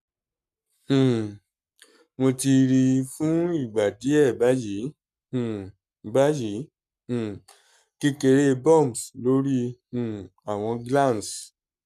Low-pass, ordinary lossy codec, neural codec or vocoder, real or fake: 14.4 kHz; none; codec, 44.1 kHz, 7.8 kbps, Pupu-Codec; fake